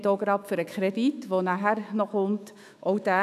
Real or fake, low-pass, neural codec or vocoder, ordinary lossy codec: fake; 14.4 kHz; autoencoder, 48 kHz, 128 numbers a frame, DAC-VAE, trained on Japanese speech; none